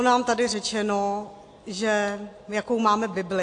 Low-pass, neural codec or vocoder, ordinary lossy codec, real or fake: 9.9 kHz; none; AAC, 48 kbps; real